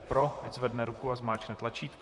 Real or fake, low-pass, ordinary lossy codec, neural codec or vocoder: fake; 10.8 kHz; MP3, 64 kbps; vocoder, 44.1 kHz, 128 mel bands, Pupu-Vocoder